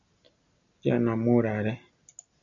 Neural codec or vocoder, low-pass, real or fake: none; 7.2 kHz; real